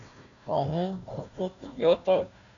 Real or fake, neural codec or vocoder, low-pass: fake; codec, 16 kHz, 1 kbps, FunCodec, trained on Chinese and English, 50 frames a second; 7.2 kHz